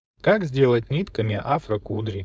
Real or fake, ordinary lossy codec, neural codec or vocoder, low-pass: fake; none; codec, 16 kHz, 4.8 kbps, FACodec; none